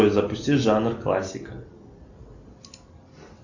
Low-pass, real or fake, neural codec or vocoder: 7.2 kHz; real; none